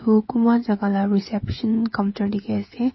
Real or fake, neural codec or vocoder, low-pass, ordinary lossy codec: fake; codec, 16 kHz, 16 kbps, FreqCodec, smaller model; 7.2 kHz; MP3, 24 kbps